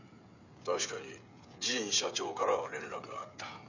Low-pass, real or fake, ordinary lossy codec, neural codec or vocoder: 7.2 kHz; fake; none; codec, 16 kHz, 16 kbps, FreqCodec, smaller model